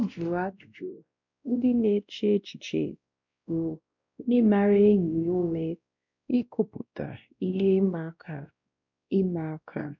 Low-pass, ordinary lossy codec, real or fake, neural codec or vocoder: 7.2 kHz; none; fake; codec, 16 kHz, 1 kbps, X-Codec, WavLM features, trained on Multilingual LibriSpeech